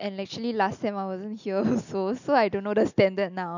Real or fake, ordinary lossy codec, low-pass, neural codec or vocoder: real; none; 7.2 kHz; none